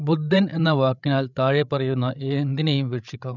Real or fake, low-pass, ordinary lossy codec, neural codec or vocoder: fake; 7.2 kHz; none; codec, 16 kHz, 16 kbps, FreqCodec, larger model